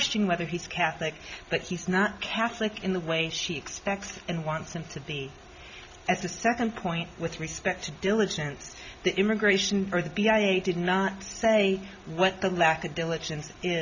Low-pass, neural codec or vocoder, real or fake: 7.2 kHz; none; real